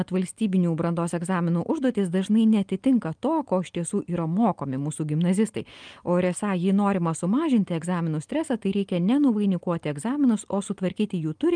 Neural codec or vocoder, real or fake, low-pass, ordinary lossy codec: none; real; 9.9 kHz; Opus, 32 kbps